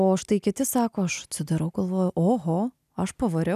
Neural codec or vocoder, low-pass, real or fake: none; 14.4 kHz; real